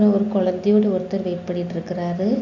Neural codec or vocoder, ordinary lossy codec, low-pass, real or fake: none; MP3, 64 kbps; 7.2 kHz; real